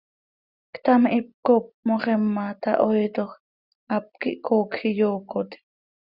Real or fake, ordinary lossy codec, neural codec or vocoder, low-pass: real; Opus, 64 kbps; none; 5.4 kHz